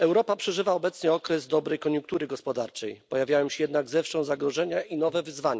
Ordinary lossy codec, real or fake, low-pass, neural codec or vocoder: none; real; none; none